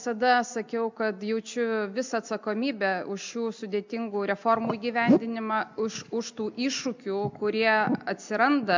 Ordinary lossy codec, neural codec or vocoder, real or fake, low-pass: MP3, 64 kbps; none; real; 7.2 kHz